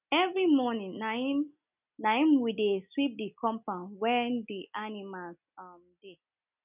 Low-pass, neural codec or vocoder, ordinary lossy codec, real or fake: 3.6 kHz; none; none; real